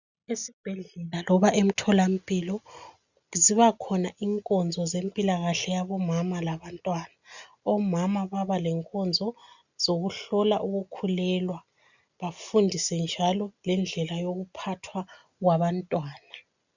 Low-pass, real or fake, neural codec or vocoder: 7.2 kHz; real; none